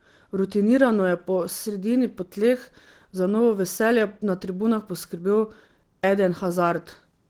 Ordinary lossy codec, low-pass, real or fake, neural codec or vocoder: Opus, 16 kbps; 19.8 kHz; real; none